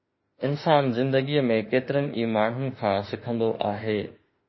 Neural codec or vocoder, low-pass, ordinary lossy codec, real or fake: autoencoder, 48 kHz, 32 numbers a frame, DAC-VAE, trained on Japanese speech; 7.2 kHz; MP3, 24 kbps; fake